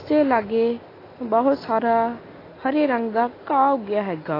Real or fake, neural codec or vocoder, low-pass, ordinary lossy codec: real; none; 5.4 kHz; AAC, 24 kbps